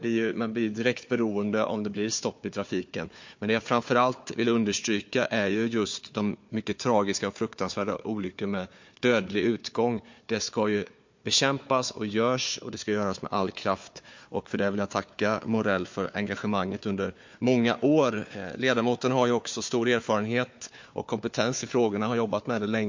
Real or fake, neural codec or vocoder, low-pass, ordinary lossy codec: fake; codec, 16 kHz, 4 kbps, FunCodec, trained on Chinese and English, 50 frames a second; 7.2 kHz; MP3, 48 kbps